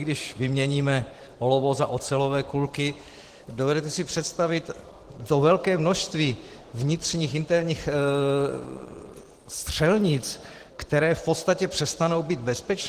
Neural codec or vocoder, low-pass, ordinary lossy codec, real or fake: vocoder, 44.1 kHz, 128 mel bands every 512 samples, BigVGAN v2; 14.4 kHz; Opus, 16 kbps; fake